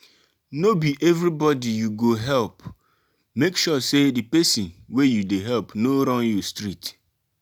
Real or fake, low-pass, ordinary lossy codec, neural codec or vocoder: real; none; none; none